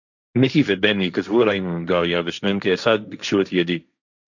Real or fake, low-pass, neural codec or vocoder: fake; 7.2 kHz; codec, 16 kHz, 1.1 kbps, Voila-Tokenizer